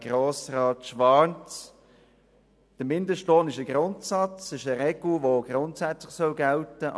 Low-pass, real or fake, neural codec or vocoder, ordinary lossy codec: none; real; none; none